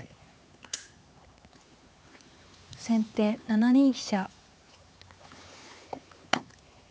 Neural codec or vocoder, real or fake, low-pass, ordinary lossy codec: codec, 16 kHz, 4 kbps, X-Codec, HuBERT features, trained on LibriSpeech; fake; none; none